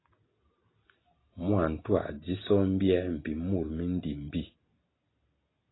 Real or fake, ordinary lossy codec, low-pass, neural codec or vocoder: real; AAC, 16 kbps; 7.2 kHz; none